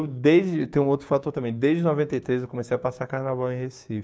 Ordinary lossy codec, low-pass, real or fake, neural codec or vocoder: none; none; fake; codec, 16 kHz, 6 kbps, DAC